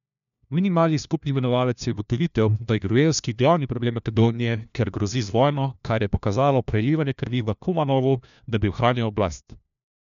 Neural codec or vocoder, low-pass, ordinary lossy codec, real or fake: codec, 16 kHz, 1 kbps, FunCodec, trained on LibriTTS, 50 frames a second; 7.2 kHz; none; fake